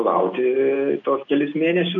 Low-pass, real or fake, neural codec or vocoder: 7.2 kHz; real; none